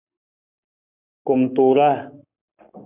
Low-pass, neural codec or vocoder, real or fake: 3.6 kHz; codec, 44.1 kHz, 7.8 kbps, Pupu-Codec; fake